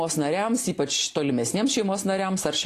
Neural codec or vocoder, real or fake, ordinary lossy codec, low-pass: none; real; AAC, 48 kbps; 14.4 kHz